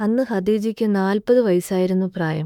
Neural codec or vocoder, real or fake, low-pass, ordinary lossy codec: autoencoder, 48 kHz, 32 numbers a frame, DAC-VAE, trained on Japanese speech; fake; 19.8 kHz; none